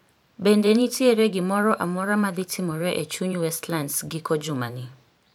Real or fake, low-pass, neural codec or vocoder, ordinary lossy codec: fake; 19.8 kHz; vocoder, 44.1 kHz, 128 mel bands every 512 samples, BigVGAN v2; none